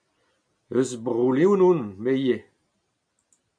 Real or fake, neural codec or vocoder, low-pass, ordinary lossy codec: real; none; 9.9 kHz; MP3, 96 kbps